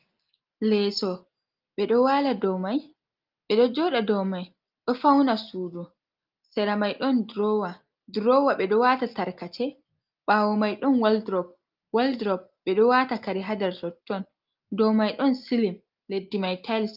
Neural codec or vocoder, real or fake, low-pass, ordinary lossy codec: none; real; 5.4 kHz; Opus, 32 kbps